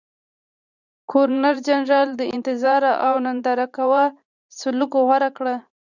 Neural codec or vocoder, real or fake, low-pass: vocoder, 44.1 kHz, 128 mel bands every 512 samples, BigVGAN v2; fake; 7.2 kHz